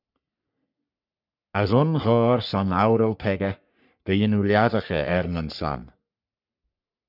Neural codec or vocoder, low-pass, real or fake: codec, 44.1 kHz, 3.4 kbps, Pupu-Codec; 5.4 kHz; fake